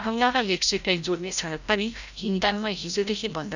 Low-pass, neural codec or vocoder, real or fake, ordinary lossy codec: 7.2 kHz; codec, 16 kHz, 0.5 kbps, FreqCodec, larger model; fake; none